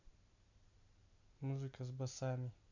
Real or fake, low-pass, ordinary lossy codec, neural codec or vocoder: real; 7.2 kHz; none; none